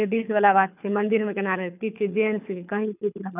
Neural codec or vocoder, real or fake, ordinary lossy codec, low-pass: codec, 24 kHz, 6 kbps, HILCodec; fake; none; 3.6 kHz